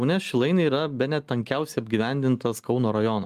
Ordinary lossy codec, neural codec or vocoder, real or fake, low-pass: Opus, 32 kbps; none; real; 14.4 kHz